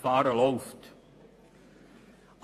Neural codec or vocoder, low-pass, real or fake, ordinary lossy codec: vocoder, 48 kHz, 128 mel bands, Vocos; 14.4 kHz; fake; AAC, 64 kbps